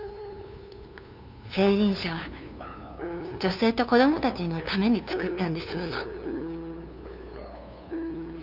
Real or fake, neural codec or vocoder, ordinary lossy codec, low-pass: fake; codec, 16 kHz, 2 kbps, FunCodec, trained on LibriTTS, 25 frames a second; none; 5.4 kHz